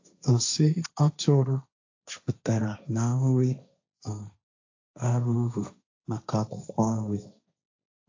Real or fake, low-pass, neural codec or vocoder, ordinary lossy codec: fake; 7.2 kHz; codec, 16 kHz, 1.1 kbps, Voila-Tokenizer; AAC, 48 kbps